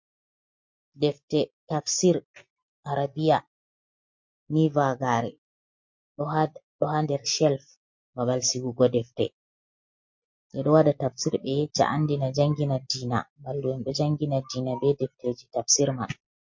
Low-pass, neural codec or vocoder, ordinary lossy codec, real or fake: 7.2 kHz; none; MP3, 48 kbps; real